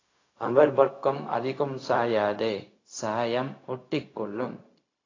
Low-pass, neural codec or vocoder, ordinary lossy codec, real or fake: 7.2 kHz; codec, 16 kHz, 0.4 kbps, LongCat-Audio-Codec; AAC, 32 kbps; fake